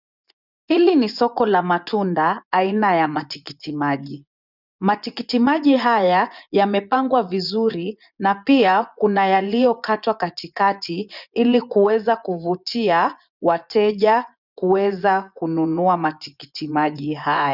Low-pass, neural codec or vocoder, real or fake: 5.4 kHz; vocoder, 24 kHz, 100 mel bands, Vocos; fake